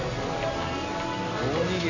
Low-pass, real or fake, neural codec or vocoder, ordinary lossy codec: 7.2 kHz; real; none; none